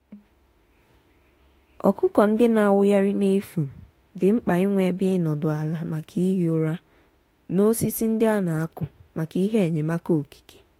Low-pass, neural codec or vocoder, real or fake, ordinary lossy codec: 19.8 kHz; autoencoder, 48 kHz, 32 numbers a frame, DAC-VAE, trained on Japanese speech; fake; AAC, 48 kbps